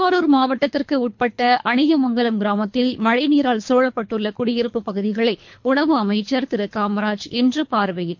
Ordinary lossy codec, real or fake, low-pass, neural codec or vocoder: MP3, 48 kbps; fake; 7.2 kHz; codec, 24 kHz, 3 kbps, HILCodec